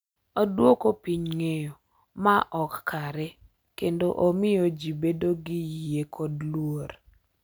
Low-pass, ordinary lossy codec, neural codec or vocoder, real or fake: none; none; none; real